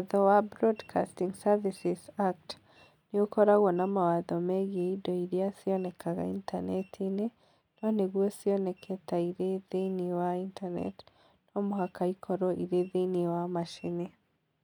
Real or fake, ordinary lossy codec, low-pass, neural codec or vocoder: real; none; 19.8 kHz; none